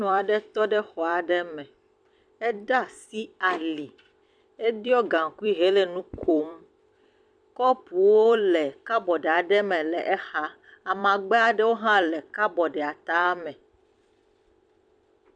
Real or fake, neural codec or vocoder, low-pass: fake; vocoder, 44.1 kHz, 128 mel bands every 256 samples, BigVGAN v2; 9.9 kHz